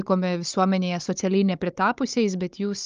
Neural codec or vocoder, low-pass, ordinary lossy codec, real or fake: codec, 16 kHz, 16 kbps, FunCodec, trained on Chinese and English, 50 frames a second; 7.2 kHz; Opus, 24 kbps; fake